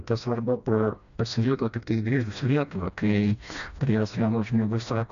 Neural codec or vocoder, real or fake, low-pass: codec, 16 kHz, 1 kbps, FreqCodec, smaller model; fake; 7.2 kHz